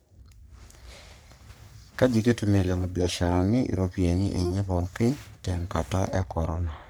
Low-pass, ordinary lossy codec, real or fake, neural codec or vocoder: none; none; fake; codec, 44.1 kHz, 3.4 kbps, Pupu-Codec